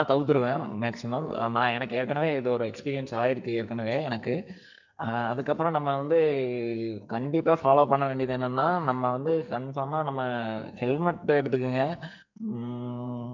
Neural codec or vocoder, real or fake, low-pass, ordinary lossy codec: codec, 44.1 kHz, 2.6 kbps, SNAC; fake; 7.2 kHz; none